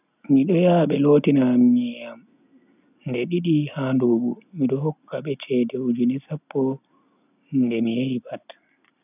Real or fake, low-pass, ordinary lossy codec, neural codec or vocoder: real; 3.6 kHz; none; none